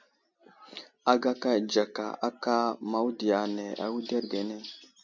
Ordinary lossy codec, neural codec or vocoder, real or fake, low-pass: MP3, 64 kbps; none; real; 7.2 kHz